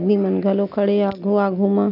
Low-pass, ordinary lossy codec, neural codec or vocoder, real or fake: 5.4 kHz; none; none; real